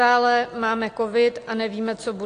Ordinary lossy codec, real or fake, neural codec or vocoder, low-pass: AAC, 48 kbps; real; none; 9.9 kHz